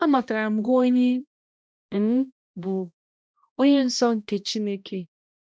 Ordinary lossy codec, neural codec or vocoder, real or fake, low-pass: none; codec, 16 kHz, 1 kbps, X-Codec, HuBERT features, trained on balanced general audio; fake; none